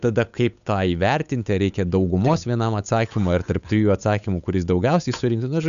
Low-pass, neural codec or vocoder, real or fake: 7.2 kHz; codec, 16 kHz, 8 kbps, FunCodec, trained on Chinese and English, 25 frames a second; fake